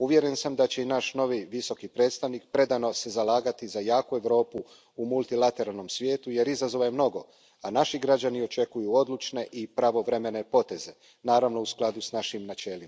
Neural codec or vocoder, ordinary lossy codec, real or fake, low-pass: none; none; real; none